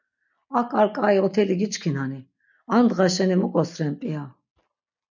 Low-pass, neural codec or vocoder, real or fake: 7.2 kHz; none; real